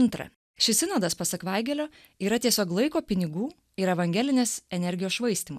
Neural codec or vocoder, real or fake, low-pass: none; real; 14.4 kHz